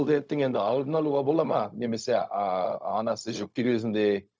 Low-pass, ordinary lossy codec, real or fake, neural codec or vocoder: none; none; fake; codec, 16 kHz, 0.4 kbps, LongCat-Audio-Codec